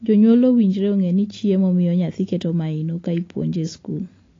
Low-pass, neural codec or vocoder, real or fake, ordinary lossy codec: 7.2 kHz; none; real; AAC, 32 kbps